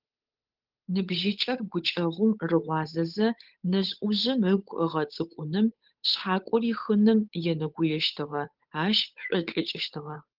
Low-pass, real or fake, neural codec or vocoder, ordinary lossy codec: 5.4 kHz; fake; codec, 16 kHz, 8 kbps, FunCodec, trained on Chinese and English, 25 frames a second; Opus, 32 kbps